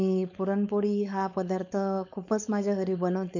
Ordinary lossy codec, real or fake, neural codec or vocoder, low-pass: none; fake; codec, 16 kHz, 4.8 kbps, FACodec; 7.2 kHz